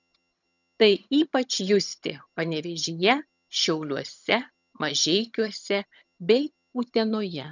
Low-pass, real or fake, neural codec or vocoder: 7.2 kHz; fake; vocoder, 22.05 kHz, 80 mel bands, HiFi-GAN